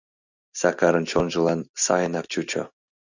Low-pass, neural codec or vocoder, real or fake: 7.2 kHz; none; real